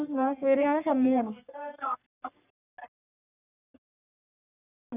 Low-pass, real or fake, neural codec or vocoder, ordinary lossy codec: 3.6 kHz; fake; codec, 44.1 kHz, 3.4 kbps, Pupu-Codec; none